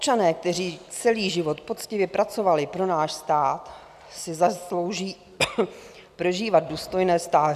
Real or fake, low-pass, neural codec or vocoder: real; 14.4 kHz; none